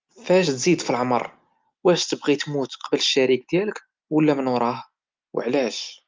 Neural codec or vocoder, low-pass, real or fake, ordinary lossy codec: none; 7.2 kHz; real; Opus, 24 kbps